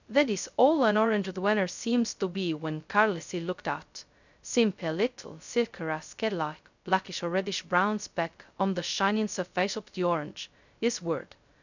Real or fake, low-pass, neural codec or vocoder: fake; 7.2 kHz; codec, 16 kHz, 0.2 kbps, FocalCodec